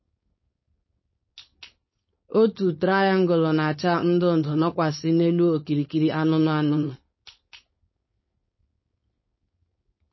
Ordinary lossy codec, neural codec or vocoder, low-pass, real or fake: MP3, 24 kbps; codec, 16 kHz, 4.8 kbps, FACodec; 7.2 kHz; fake